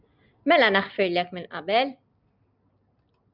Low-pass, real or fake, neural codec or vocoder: 5.4 kHz; real; none